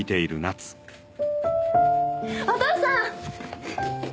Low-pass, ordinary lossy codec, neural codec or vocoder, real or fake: none; none; none; real